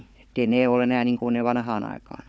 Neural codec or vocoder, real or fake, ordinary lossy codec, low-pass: codec, 16 kHz, 4 kbps, FunCodec, trained on Chinese and English, 50 frames a second; fake; none; none